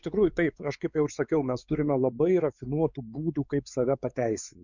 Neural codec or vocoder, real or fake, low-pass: codec, 16 kHz, 4 kbps, X-Codec, WavLM features, trained on Multilingual LibriSpeech; fake; 7.2 kHz